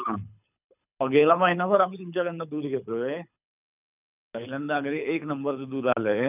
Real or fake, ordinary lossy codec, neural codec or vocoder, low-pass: fake; none; codec, 24 kHz, 6 kbps, HILCodec; 3.6 kHz